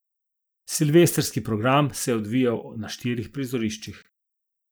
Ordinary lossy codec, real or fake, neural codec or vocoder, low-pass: none; real; none; none